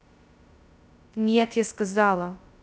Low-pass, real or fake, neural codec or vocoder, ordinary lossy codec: none; fake; codec, 16 kHz, 0.2 kbps, FocalCodec; none